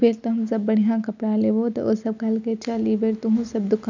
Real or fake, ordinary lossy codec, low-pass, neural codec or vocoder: real; none; 7.2 kHz; none